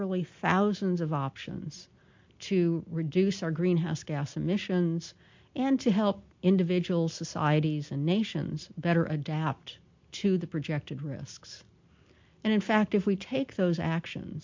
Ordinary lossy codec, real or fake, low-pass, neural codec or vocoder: MP3, 48 kbps; real; 7.2 kHz; none